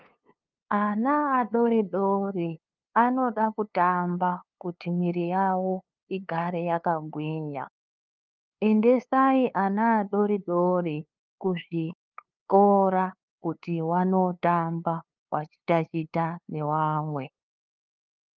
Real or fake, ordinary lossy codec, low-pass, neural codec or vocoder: fake; Opus, 24 kbps; 7.2 kHz; codec, 16 kHz, 2 kbps, FunCodec, trained on LibriTTS, 25 frames a second